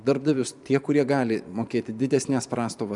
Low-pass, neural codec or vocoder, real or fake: 10.8 kHz; vocoder, 44.1 kHz, 128 mel bands every 512 samples, BigVGAN v2; fake